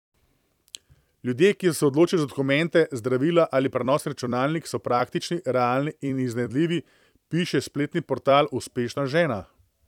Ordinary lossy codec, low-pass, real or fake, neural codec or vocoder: none; 19.8 kHz; fake; vocoder, 44.1 kHz, 128 mel bands every 256 samples, BigVGAN v2